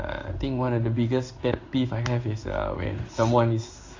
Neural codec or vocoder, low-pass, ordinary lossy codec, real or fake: codec, 16 kHz in and 24 kHz out, 1 kbps, XY-Tokenizer; 7.2 kHz; AAC, 48 kbps; fake